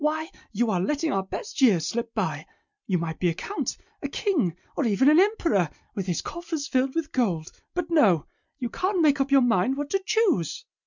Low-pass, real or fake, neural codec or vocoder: 7.2 kHz; real; none